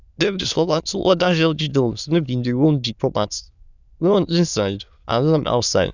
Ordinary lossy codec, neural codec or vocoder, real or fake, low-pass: none; autoencoder, 22.05 kHz, a latent of 192 numbers a frame, VITS, trained on many speakers; fake; 7.2 kHz